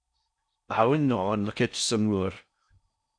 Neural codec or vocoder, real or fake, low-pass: codec, 16 kHz in and 24 kHz out, 0.6 kbps, FocalCodec, streaming, 4096 codes; fake; 9.9 kHz